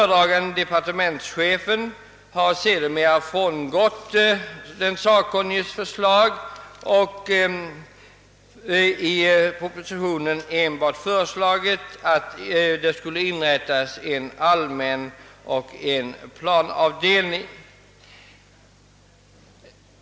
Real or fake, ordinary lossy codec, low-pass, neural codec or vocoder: real; none; none; none